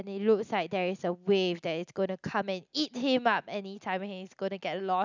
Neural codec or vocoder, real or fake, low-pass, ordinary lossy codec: none; real; 7.2 kHz; none